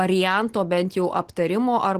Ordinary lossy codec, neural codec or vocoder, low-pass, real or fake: Opus, 16 kbps; none; 14.4 kHz; real